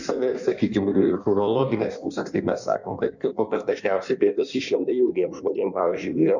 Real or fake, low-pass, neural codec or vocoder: fake; 7.2 kHz; codec, 16 kHz in and 24 kHz out, 1.1 kbps, FireRedTTS-2 codec